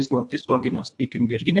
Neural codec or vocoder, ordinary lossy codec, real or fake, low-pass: codec, 24 kHz, 1.5 kbps, HILCodec; AAC, 64 kbps; fake; 10.8 kHz